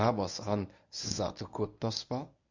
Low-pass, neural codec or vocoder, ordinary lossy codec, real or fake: 7.2 kHz; codec, 24 kHz, 0.9 kbps, WavTokenizer, medium speech release version 1; none; fake